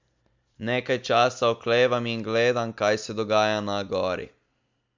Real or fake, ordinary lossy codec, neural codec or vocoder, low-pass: real; MP3, 64 kbps; none; 7.2 kHz